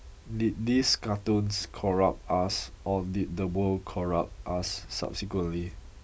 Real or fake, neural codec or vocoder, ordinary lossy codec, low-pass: real; none; none; none